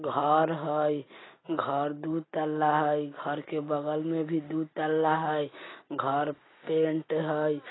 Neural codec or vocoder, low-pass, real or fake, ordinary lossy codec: none; 7.2 kHz; real; AAC, 16 kbps